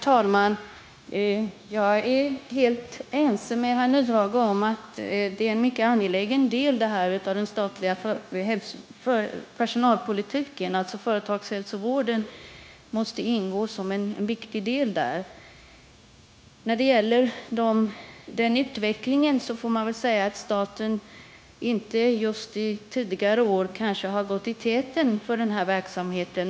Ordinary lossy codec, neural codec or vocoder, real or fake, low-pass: none; codec, 16 kHz, 0.9 kbps, LongCat-Audio-Codec; fake; none